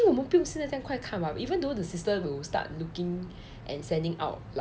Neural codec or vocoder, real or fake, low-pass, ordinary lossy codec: none; real; none; none